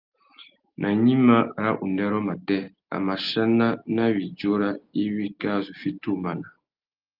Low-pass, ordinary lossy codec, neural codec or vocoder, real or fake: 5.4 kHz; Opus, 32 kbps; none; real